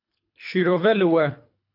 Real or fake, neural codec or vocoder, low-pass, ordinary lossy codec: fake; codec, 24 kHz, 3 kbps, HILCodec; 5.4 kHz; AAC, 32 kbps